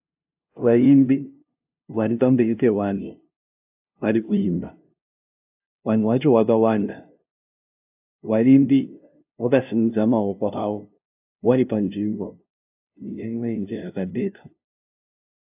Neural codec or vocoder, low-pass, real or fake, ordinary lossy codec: codec, 16 kHz, 0.5 kbps, FunCodec, trained on LibriTTS, 25 frames a second; 3.6 kHz; fake; AAC, 32 kbps